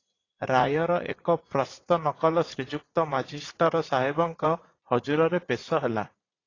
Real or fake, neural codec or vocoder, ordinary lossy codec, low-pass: fake; vocoder, 44.1 kHz, 80 mel bands, Vocos; AAC, 32 kbps; 7.2 kHz